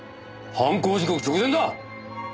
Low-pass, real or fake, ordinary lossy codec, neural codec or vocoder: none; real; none; none